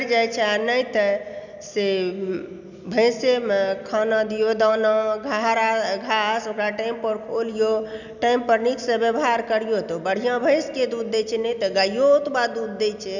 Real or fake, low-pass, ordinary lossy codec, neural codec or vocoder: real; 7.2 kHz; none; none